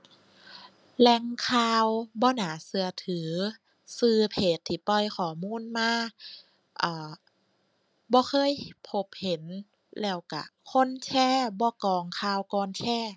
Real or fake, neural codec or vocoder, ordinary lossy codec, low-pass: real; none; none; none